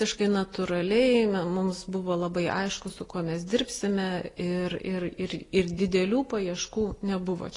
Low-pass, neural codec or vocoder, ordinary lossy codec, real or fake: 10.8 kHz; none; AAC, 32 kbps; real